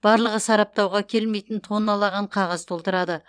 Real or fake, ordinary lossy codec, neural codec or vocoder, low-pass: fake; none; vocoder, 22.05 kHz, 80 mel bands, WaveNeXt; 9.9 kHz